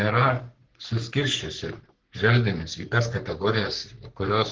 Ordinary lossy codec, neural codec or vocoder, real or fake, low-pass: Opus, 16 kbps; codec, 44.1 kHz, 3.4 kbps, Pupu-Codec; fake; 7.2 kHz